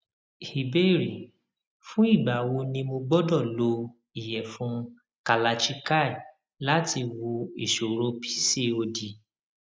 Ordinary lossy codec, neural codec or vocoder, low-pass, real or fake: none; none; none; real